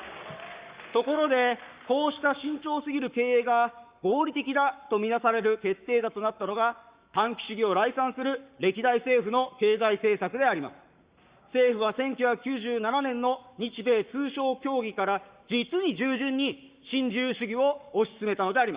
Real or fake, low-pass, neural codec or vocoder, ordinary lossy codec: fake; 3.6 kHz; codec, 44.1 kHz, 7.8 kbps, Pupu-Codec; Opus, 64 kbps